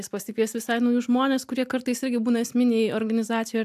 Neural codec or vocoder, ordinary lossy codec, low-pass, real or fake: none; AAC, 96 kbps; 14.4 kHz; real